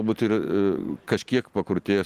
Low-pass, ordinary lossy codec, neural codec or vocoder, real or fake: 14.4 kHz; Opus, 24 kbps; none; real